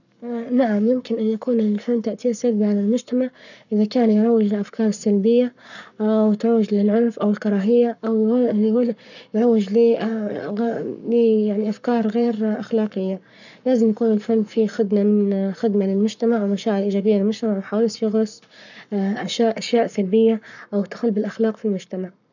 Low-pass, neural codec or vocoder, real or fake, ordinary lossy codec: 7.2 kHz; codec, 44.1 kHz, 7.8 kbps, Pupu-Codec; fake; none